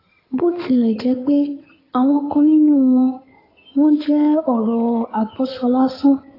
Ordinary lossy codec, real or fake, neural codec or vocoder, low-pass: AAC, 24 kbps; fake; codec, 16 kHz in and 24 kHz out, 2.2 kbps, FireRedTTS-2 codec; 5.4 kHz